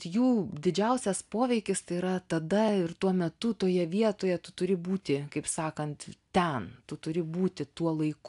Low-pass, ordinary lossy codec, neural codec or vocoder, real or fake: 10.8 kHz; AAC, 96 kbps; none; real